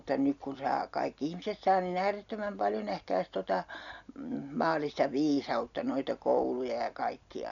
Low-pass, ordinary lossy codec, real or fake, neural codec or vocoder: 7.2 kHz; none; real; none